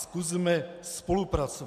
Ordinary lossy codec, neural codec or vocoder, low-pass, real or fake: MP3, 96 kbps; none; 14.4 kHz; real